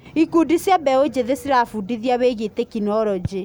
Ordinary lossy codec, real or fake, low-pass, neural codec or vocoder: none; real; none; none